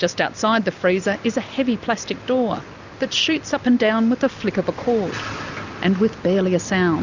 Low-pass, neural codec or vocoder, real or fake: 7.2 kHz; none; real